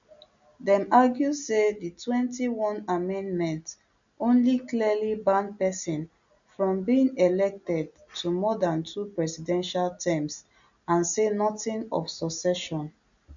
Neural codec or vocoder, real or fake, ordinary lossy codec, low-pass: none; real; none; 7.2 kHz